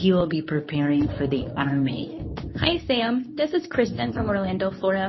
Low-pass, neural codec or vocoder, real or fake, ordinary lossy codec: 7.2 kHz; codec, 24 kHz, 0.9 kbps, WavTokenizer, medium speech release version 2; fake; MP3, 24 kbps